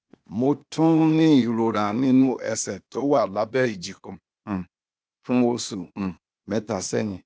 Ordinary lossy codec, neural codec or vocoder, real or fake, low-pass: none; codec, 16 kHz, 0.8 kbps, ZipCodec; fake; none